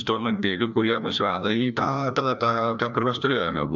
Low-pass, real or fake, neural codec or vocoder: 7.2 kHz; fake; codec, 16 kHz, 1 kbps, FreqCodec, larger model